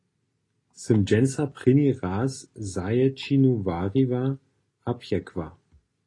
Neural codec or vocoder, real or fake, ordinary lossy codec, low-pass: none; real; AAC, 32 kbps; 10.8 kHz